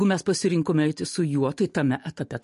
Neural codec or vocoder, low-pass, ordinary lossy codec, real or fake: none; 14.4 kHz; MP3, 48 kbps; real